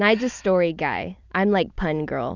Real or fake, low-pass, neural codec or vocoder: fake; 7.2 kHz; vocoder, 44.1 kHz, 128 mel bands every 256 samples, BigVGAN v2